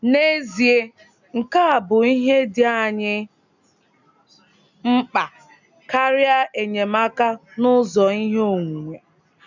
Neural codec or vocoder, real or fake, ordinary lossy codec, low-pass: none; real; none; 7.2 kHz